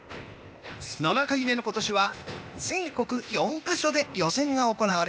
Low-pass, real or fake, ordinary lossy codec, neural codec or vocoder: none; fake; none; codec, 16 kHz, 0.8 kbps, ZipCodec